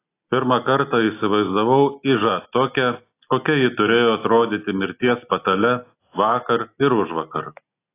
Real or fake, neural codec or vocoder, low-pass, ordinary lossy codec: real; none; 3.6 kHz; AAC, 24 kbps